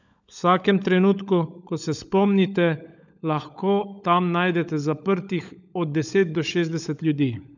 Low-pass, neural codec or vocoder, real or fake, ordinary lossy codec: 7.2 kHz; codec, 16 kHz, 16 kbps, FunCodec, trained on LibriTTS, 50 frames a second; fake; none